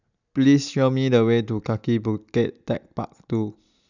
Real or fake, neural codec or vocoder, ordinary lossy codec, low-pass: real; none; none; 7.2 kHz